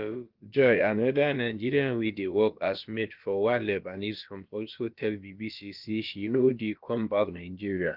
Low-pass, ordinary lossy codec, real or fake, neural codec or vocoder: 5.4 kHz; Opus, 32 kbps; fake; codec, 16 kHz, about 1 kbps, DyCAST, with the encoder's durations